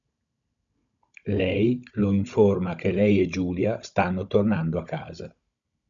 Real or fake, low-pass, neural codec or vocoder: fake; 7.2 kHz; codec, 16 kHz, 16 kbps, FunCodec, trained on Chinese and English, 50 frames a second